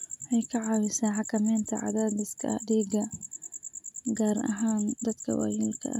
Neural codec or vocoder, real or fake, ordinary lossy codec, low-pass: none; real; none; 19.8 kHz